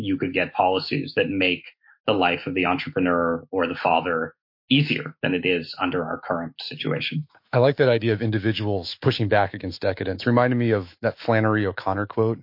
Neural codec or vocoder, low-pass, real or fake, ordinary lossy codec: autoencoder, 48 kHz, 128 numbers a frame, DAC-VAE, trained on Japanese speech; 5.4 kHz; fake; MP3, 32 kbps